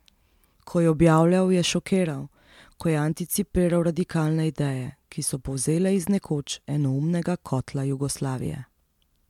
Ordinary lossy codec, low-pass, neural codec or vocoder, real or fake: MP3, 96 kbps; 19.8 kHz; none; real